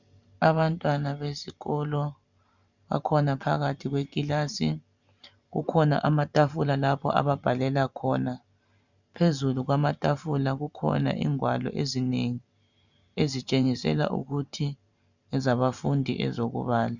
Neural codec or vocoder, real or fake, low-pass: vocoder, 44.1 kHz, 128 mel bands every 512 samples, BigVGAN v2; fake; 7.2 kHz